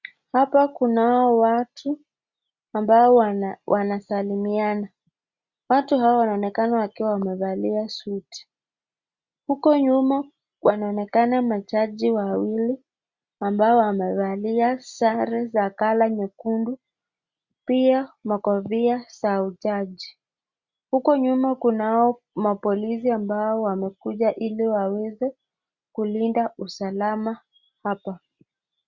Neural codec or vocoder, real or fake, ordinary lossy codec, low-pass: none; real; Opus, 64 kbps; 7.2 kHz